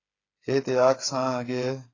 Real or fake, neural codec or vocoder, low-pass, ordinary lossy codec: fake; codec, 16 kHz, 8 kbps, FreqCodec, smaller model; 7.2 kHz; AAC, 32 kbps